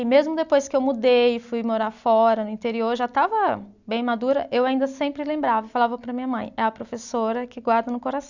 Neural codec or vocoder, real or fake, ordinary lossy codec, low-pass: none; real; none; 7.2 kHz